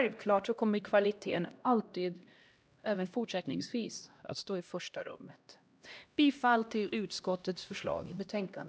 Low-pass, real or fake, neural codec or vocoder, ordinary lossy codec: none; fake; codec, 16 kHz, 1 kbps, X-Codec, HuBERT features, trained on LibriSpeech; none